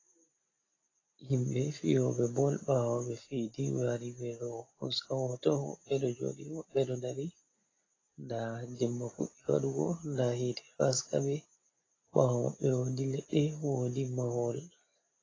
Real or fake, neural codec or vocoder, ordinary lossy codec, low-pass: real; none; AAC, 32 kbps; 7.2 kHz